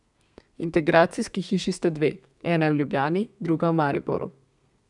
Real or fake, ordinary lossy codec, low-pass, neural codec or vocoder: fake; none; 10.8 kHz; codec, 32 kHz, 1.9 kbps, SNAC